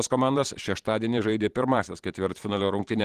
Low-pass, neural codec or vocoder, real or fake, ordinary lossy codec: 14.4 kHz; vocoder, 44.1 kHz, 128 mel bands every 512 samples, BigVGAN v2; fake; Opus, 16 kbps